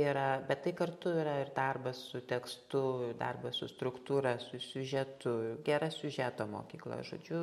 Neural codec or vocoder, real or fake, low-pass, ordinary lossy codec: none; real; 19.8 kHz; MP3, 64 kbps